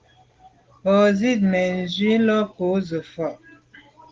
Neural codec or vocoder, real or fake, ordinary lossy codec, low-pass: none; real; Opus, 16 kbps; 7.2 kHz